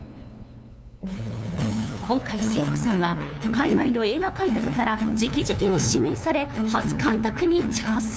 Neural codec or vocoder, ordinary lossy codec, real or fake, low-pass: codec, 16 kHz, 2 kbps, FunCodec, trained on LibriTTS, 25 frames a second; none; fake; none